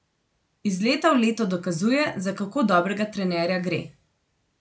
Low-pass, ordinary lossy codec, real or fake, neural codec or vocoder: none; none; real; none